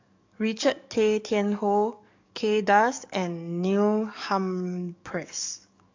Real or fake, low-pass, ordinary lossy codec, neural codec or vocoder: fake; 7.2 kHz; AAC, 48 kbps; codec, 44.1 kHz, 7.8 kbps, DAC